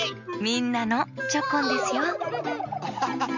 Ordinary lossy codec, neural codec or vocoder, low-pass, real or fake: none; none; 7.2 kHz; real